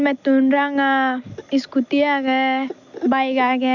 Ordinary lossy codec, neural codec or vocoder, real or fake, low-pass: none; none; real; 7.2 kHz